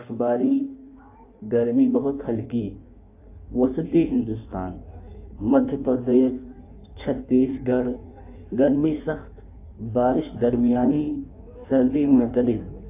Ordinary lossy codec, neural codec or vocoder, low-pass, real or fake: AAC, 24 kbps; codec, 16 kHz in and 24 kHz out, 1.1 kbps, FireRedTTS-2 codec; 3.6 kHz; fake